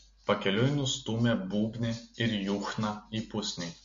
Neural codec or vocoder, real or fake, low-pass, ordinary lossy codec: none; real; 7.2 kHz; MP3, 48 kbps